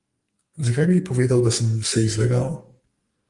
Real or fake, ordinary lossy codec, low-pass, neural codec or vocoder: fake; Opus, 32 kbps; 10.8 kHz; codec, 44.1 kHz, 2.6 kbps, SNAC